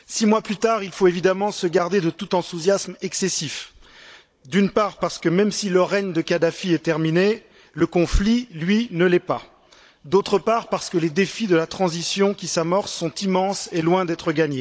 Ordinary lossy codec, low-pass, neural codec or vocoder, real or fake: none; none; codec, 16 kHz, 16 kbps, FunCodec, trained on Chinese and English, 50 frames a second; fake